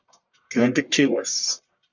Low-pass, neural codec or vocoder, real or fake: 7.2 kHz; codec, 44.1 kHz, 1.7 kbps, Pupu-Codec; fake